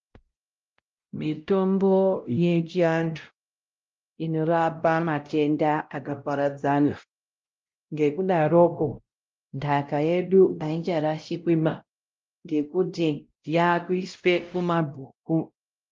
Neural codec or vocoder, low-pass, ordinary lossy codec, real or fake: codec, 16 kHz, 0.5 kbps, X-Codec, WavLM features, trained on Multilingual LibriSpeech; 7.2 kHz; Opus, 32 kbps; fake